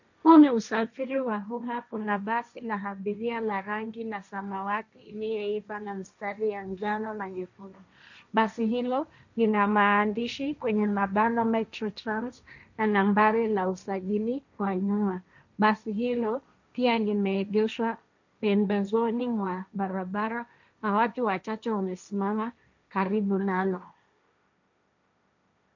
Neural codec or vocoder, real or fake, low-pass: codec, 16 kHz, 1.1 kbps, Voila-Tokenizer; fake; 7.2 kHz